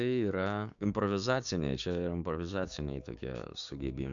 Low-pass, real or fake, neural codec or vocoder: 7.2 kHz; fake; codec, 16 kHz, 6 kbps, DAC